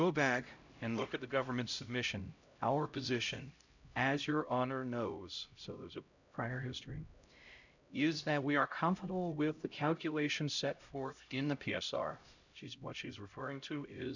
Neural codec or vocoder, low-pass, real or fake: codec, 16 kHz, 0.5 kbps, X-Codec, HuBERT features, trained on LibriSpeech; 7.2 kHz; fake